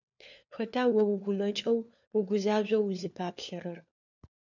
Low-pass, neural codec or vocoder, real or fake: 7.2 kHz; codec, 16 kHz, 4 kbps, FunCodec, trained on LibriTTS, 50 frames a second; fake